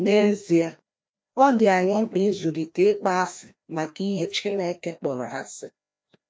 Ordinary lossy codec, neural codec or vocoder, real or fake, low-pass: none; codec, 16 kHz, 1 kbps, FreqCodec, larger model; fake; none